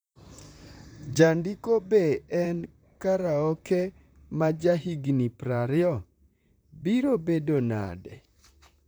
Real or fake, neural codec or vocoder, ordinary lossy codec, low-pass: fake; vocoder, 44.1 kHz, 128 mel bands, Pupu-Vocoder; none; none